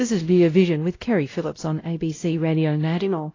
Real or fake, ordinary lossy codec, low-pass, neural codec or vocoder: fake; AAC, 32 kbps; 7.2 kHz; codec, 16 kHz, 0.5 kbps, X-Codec, WavLM features, trained on Multilingual LibriSpeech